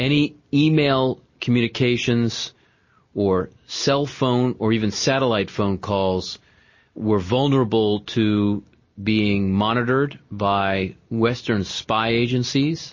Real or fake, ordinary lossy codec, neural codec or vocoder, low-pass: real; MP3, 32 kbps; none; 7.2 kHz